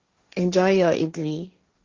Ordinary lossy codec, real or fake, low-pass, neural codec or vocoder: Opus, 32 kbps; fake; 7.2 kHz; codec, 16 kHz, 1.1 kbps, Voila-Tokenizer